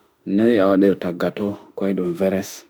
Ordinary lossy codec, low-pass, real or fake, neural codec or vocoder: none; none; fake; autoencoder, 48 kHz, 32 numbers a frame, DAC-VAE, trained on Japanese speech